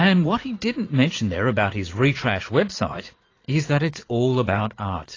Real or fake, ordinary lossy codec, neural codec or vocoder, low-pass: fake; AAC, 32 kbps; vocoder, 44.1 kHz, 80 mel bands, Vocos; 7.2 kHz